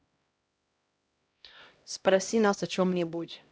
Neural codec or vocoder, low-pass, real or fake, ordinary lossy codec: codec, 16 kHz, 0.5 kbps, X-Codec, HuBERT features, trained on LibriSpeech; none; fake; none